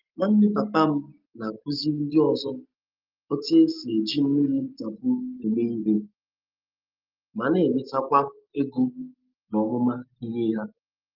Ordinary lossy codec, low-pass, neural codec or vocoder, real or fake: Opus, 24 kbps; 5.4 kHz; none; real